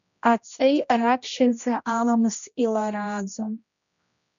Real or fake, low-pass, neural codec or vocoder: fake; 7.2 kHz; codec, 16 kHz, 1 kbps, X-Codec, HuBERT features, trained on general audio